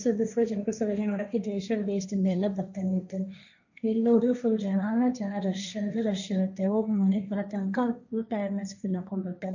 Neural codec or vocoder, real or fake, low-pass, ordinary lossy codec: codec, 16 kHz, 1.1 kbps, Voila-Tokenizer; fake; 7.2 kHz; none